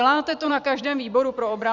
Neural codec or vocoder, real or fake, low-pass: vocoder, 44.1 kHz, 128 mel bands every 256 samples, BigVGAN v2; fake; 7.2 kHz